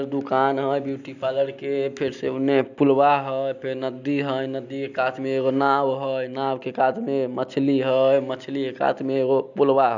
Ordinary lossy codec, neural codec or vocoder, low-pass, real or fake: none; none; 7.2 kHz; real